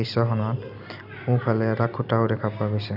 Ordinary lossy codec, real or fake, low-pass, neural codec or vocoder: none; real; 5.4 kHz; none